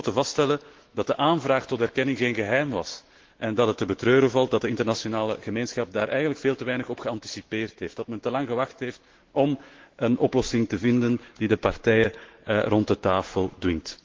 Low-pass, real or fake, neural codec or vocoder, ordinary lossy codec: 7.2 kHz; fake; autoencoder, 48 kHz, 128 numbers a frame, DAC-VAE, trained on Japanese speech; Opus, 16 kbps